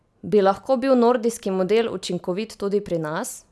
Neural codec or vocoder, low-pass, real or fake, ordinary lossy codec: none; none; real; none